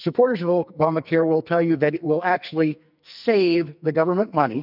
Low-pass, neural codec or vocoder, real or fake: 5.4 kHz; codec, 44.1 kHz, 2.6 kbps, SNAC; fake